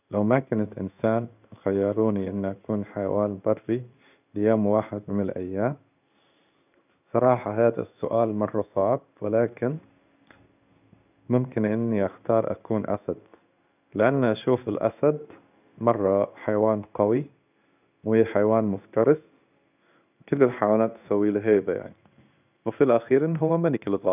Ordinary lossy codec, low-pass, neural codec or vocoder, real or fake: none; 3.6 kHz; codec, 16 kHz in and 24 kHz out, 1 kbps, XY-Tokenizer; fake